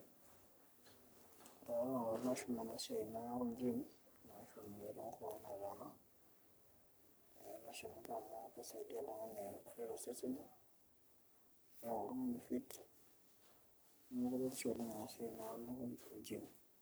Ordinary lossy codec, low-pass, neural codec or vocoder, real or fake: none; none; codec, 44.1 kHz, 3.4 kbps, Pupu-Codec; fake